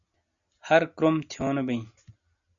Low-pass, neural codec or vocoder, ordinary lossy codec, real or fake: 7.2 kHz; none; MP3, 96 kbps; real